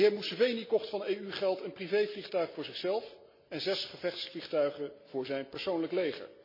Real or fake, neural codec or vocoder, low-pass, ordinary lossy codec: real; none; 5.4 kHz; MP3, 24 kbps